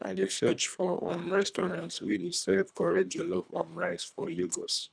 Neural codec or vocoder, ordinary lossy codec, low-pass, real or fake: codec, 24 kHz, 1.5 kbps, HILCodec; none; 9.9 kHz; fake